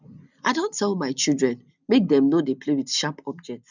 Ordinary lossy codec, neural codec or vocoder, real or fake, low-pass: none; none; real; 7.2 kHz